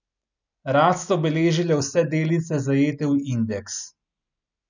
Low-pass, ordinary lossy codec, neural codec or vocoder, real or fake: 7.2 kHz; none; none; real